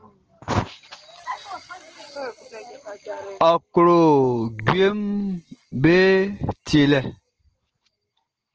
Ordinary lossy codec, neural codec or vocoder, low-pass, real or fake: Opus, 16 kbps; none; 7.2 kHz; real